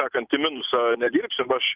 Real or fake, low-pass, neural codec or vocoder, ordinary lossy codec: real; 3.6 kHz; none; Opus, 24 kbps